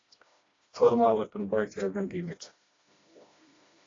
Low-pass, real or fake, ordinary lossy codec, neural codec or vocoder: 7.2 kHz; fake; AAC, 32 kbps; codec, 16 kHz, 1 kbps, FreqCodec, smaller model